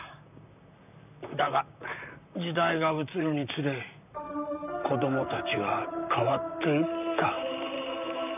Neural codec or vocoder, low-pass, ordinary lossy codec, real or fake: vocoder, 44.1 kHz, 128 mel bands, Pupu-Vocoder; 3.6 kHz; none; fake